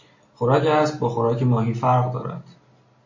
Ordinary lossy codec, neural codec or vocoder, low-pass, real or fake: MP3, 32 kbps; none; 7.2 kHz; real